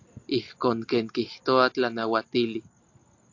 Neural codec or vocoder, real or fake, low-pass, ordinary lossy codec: none; real; 7.2 kHz; AAC, 48 kbps